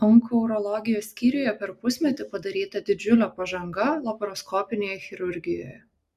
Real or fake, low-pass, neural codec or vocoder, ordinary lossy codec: real; 14.4 kHz; none; Opus, 64 kbps